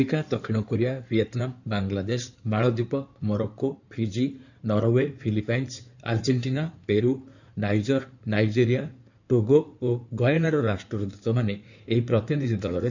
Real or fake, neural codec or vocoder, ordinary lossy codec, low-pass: fake; codec, 16 kHz in and 24 kHz out, 2.2 kbps, FireRedTTS-2 codec; none; 7.2 kHz